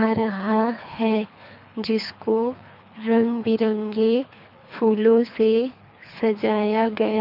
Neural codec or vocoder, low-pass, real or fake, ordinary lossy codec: codec, 24 kHz, 3 kbps, HILCodec; 5.4 kHz; fake; none